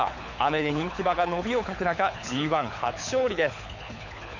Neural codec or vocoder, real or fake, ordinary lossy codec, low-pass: codec, 24 kHz, 6 kbps, HILCodec; fake; none; 7.2 kHz